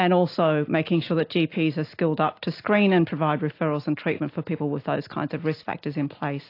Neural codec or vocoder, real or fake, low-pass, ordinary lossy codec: none; real; 5.4 kHz; AAC, 32 kbps